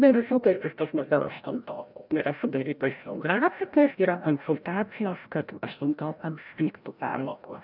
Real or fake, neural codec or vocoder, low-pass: fake; codec, 16 kHz, 0.5 kbps, FreqCodec, larger model; 5.4 kHz